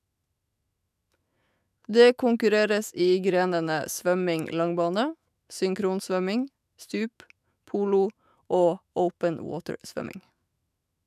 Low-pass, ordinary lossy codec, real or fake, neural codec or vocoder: 14.4 kHz; none; fake; autoencoder, 48 kHz, 128 numbers a frame, DAC-VAE, trained on Japanese speech